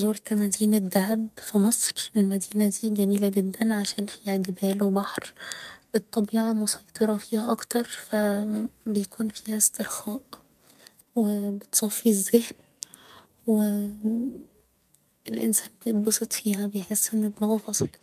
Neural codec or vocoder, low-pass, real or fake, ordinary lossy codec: codec, 44.1 kHz, 2.6 kbps, SNAC; 14.4 kHz; fake; MP3, 96 kbps